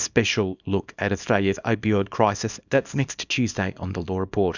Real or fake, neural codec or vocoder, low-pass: fake; codec, 24 kHz, 0.9 kbps, WavTokenizer, small release; 7.2 kHz